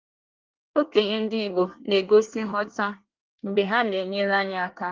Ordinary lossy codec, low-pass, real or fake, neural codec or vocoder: Opus, 32 kbps; 7.2 kHz; fake; codec, 24 kHz, 1 kbps, SNAC